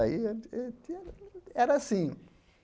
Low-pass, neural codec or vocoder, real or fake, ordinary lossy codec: none; none; real; none